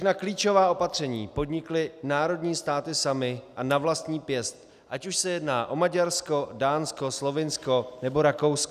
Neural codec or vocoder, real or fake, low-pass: none; real; 14.4 kHz